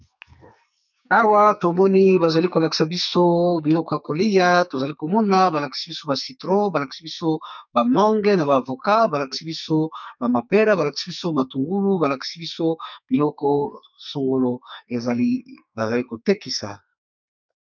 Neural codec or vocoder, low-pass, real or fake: codec, 44.1 kHz, 2.6 kbps, SNAC; 7.2 kHz; fake